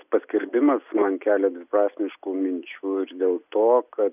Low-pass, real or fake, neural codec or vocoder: 3.6 kHz; real; none